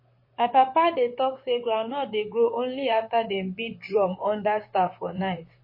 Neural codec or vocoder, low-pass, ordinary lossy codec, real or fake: vocoder, 44.1 kHz, 128 mel bands, Pupu-Vocoder; 5.4 kHz; MP3, 24 kbps; fake